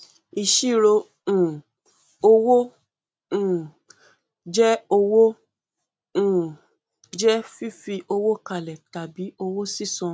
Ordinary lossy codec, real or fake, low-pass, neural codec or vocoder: none; real; none; none